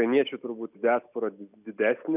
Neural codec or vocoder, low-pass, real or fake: none; 3.6 kHz; real